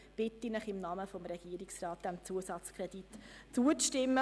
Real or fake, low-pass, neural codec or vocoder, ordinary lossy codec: real; none; none; none